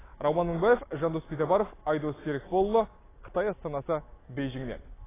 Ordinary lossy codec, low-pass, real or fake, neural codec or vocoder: AAC, 16 kbps; 3.6 kHz; real; none